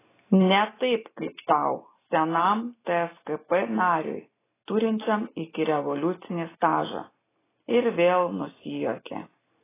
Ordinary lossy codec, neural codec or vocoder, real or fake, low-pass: AAC, 16 kbps; none; real; 3.6 kHz